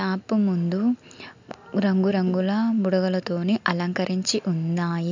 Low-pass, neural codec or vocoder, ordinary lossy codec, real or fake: 7.2 kHz; none; MP3, 64 kbps; real